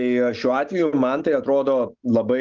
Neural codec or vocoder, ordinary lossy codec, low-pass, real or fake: none; Opus, 24 kbps; 7.2 kHz; real